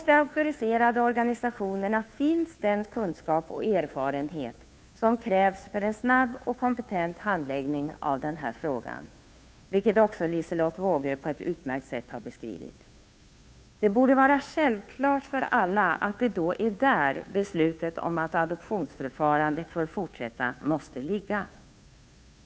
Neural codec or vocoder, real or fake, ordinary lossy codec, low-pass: codec, 16 kHz, 2 kbps, FunCodec, trained on Chinese and English, 25 frames a second; fake; none; none